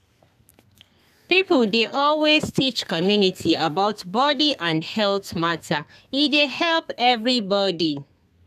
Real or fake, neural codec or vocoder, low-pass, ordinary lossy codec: fake; codec, 32 kHz, 1.9 kbps, SNAC; 14.4 kHz; none